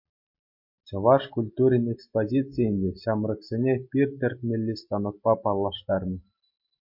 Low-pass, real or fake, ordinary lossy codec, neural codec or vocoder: 5.4 kHz; real; MP3, 32 kbps; none